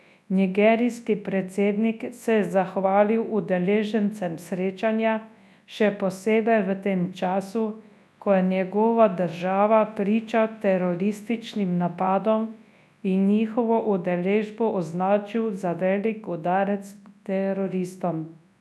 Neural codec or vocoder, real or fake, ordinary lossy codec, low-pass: codec, 24 kHz, 0.9 kbps, WavTokenizer, large speech release; fake; none; none